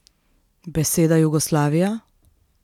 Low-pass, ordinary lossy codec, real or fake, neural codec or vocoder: 19.8 kHz; none; real; none